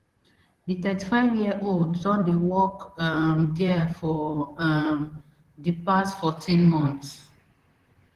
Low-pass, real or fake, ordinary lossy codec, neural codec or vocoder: 14.4 kHz; fake; Opus, 16 kbps; vocoder, 44.1 kHz, 128 mel bands, Pupu-Vocoder